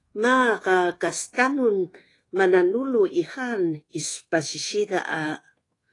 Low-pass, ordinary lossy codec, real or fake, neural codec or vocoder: 10.8 kHz; AAC, 32 kbps; fake; codec, 24 kHz, 1.2 kbps, DualCodec